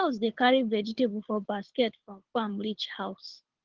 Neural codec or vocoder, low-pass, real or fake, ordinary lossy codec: codec, 24 kHz, 6 kbps, HILCodec; 7.2 kHz; fake; Opus, 16 kbps